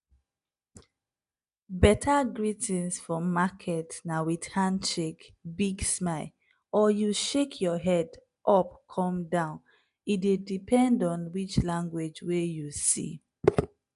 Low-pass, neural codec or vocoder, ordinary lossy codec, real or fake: 10.8 kHz; none; none; real